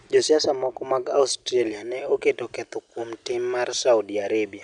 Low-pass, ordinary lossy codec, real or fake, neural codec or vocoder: 9.9 kHz; none; real; none